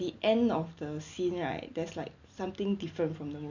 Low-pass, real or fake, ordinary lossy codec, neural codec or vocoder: 7.2 kHz; real; none; none